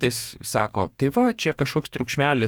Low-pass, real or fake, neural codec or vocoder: 19.8 kHz; fake; codec, 44.1 kHz, 2.6 kbps, DAC